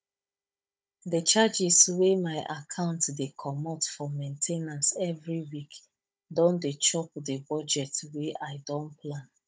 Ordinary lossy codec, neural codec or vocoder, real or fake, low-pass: none; codec, 16 kHz, 16 kbps, FunCodec, trained on Chinese and English, 50 frames a second; fake; none